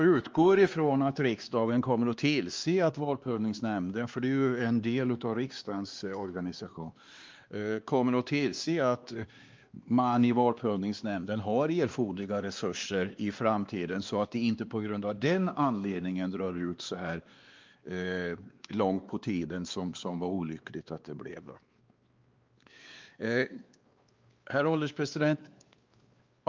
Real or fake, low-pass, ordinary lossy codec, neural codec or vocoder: fake; 7.2 kHz; Opus, 24 kbps; codec, 16 kHz, 2 kbps, X-Codec, WavLM features, trained on Multilingual LibriSpeech